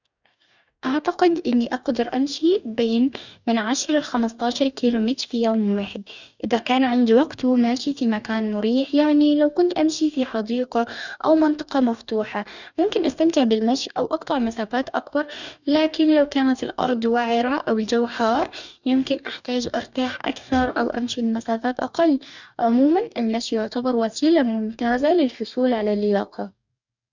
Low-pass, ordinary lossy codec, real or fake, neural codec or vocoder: 7.2 kHz; none; fake; codec, 44.1 kHz, 2.6 kbps, DAC